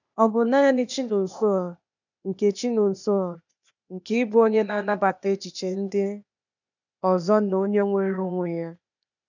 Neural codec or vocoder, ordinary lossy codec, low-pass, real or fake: codec, 16 kHz, 0.8 kbps, ZipCodec; none; 7.2 kHz; fake